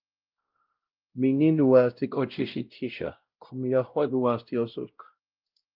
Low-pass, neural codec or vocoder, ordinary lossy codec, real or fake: 5.4 kHz; codec, 16 kHz, 0.5 kbps, X-Codec, WavLM features, trained on Multilingual LibriSpeech; Opus, 32 kbps; fake